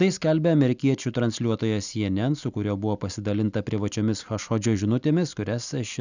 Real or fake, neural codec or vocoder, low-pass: real; none; 7.2 kHz